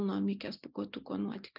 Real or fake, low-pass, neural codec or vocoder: real; 5.4 kHz; none